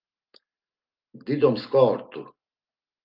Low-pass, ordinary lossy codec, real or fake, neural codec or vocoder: 5.4 kHz; Opus, 32 kbps; real; none